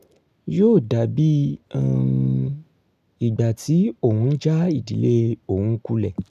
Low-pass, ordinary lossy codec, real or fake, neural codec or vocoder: 14.4 kHz; none; real; none